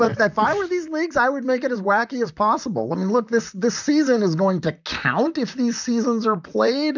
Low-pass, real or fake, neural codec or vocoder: 7.2 kHz; fake; vocoder, 44.1 kHz, 128 mel bands, Pupu-Vocoder